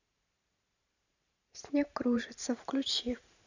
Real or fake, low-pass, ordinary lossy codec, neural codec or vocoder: fake; 7.2 kHz; none; vocoder, 44.1 kHz, 128 mel bands every 512 samples, BigVGAN v2